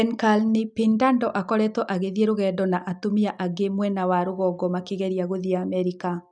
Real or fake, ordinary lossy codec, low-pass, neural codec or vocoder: real; none; 9.9 kHz; none